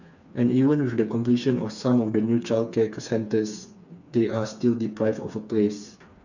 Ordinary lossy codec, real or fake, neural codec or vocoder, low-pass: none; fake; codec, 16 kHz, 4 kbps, FreqCodec, smaller model; 7.2 kHz